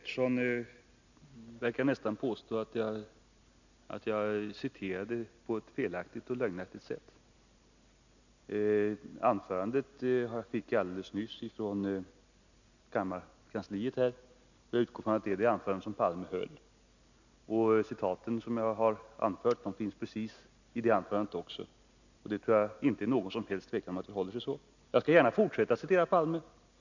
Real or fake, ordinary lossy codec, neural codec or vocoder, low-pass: real; none; none; 7.2 kHz